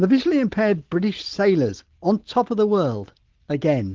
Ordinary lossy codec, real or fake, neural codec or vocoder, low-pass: Opus, 16 kbps; real; none; 7.2 kHz